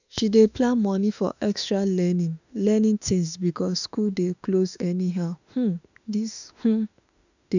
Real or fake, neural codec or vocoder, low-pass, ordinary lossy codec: fake; autoencoder, 48 kHz, 32 numbers a frame, DAC-VAE, trained on Japanese speech; 7.2 kHz; none